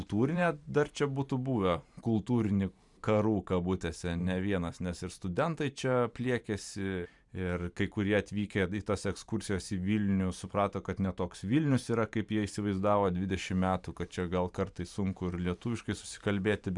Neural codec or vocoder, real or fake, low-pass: vocoder, 44.1 kHz, 128 mel bands every 512 samples, BigVGAN v2; fake; 10.8 kHz